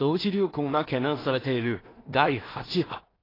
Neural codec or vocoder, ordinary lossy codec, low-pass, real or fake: codec, 16 kHz in and 24 kHz out, 0.4 kbps, LongCat-Audio-Codec, two codebook decoder; AAC, 24 kbps; 5.4 kHz; fake